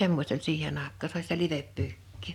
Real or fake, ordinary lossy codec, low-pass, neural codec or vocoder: real; none; 19.8 kHz; none